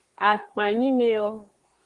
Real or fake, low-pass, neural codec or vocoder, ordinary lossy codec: fake; 10.8 kHz; codec, 24 kHz, 1 kbps, SNAC; Opus, 24 kbps